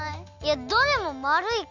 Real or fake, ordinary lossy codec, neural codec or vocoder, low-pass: real; none; none; 7.2 kHz